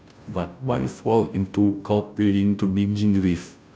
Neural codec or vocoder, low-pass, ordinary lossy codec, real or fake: codec, 16 kHz, 0.5 kbps, FunCodec, trained on Chinese and English, 25 frames a second; none; none; fake